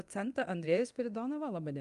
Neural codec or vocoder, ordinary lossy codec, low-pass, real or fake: codec, 24 kHz, 0.9 kbps, DualCodec; Opus, 32 kbps; 10.8 kHz; fake